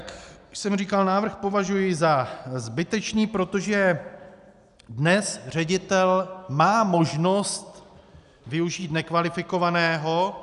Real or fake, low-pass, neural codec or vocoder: real; 10.8 kHz; none